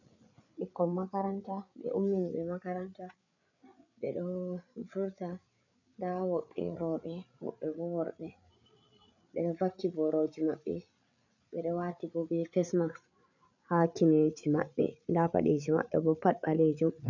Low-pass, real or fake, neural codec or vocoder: 7.2 kHz; fake; codec, 16 kHz, 8 kbps, FreqCodec, larger model